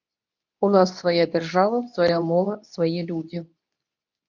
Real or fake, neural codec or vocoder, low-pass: fake; codec, 24 kHz, 0.9 kbps, WavTokenizer, medium speech release version 2; 7.2 kHz